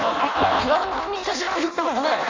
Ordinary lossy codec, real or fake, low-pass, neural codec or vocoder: MP3, 64 kbps; fake; 7.2 kHz; codec, 16 kHz in and 24 kHz out, 0.6 kbps, FireRedTTS-2 codec